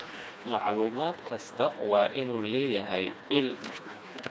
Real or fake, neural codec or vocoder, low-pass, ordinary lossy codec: fake; codec, 16 kHz, 2 kbps, FreqCodec, smaller model; none; none